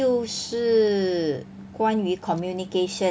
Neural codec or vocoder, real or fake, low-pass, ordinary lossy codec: none; real; none; none